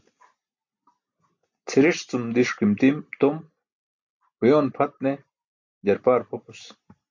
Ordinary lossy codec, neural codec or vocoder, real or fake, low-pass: MP3, 48 kbps; none; real; 7.2 kHz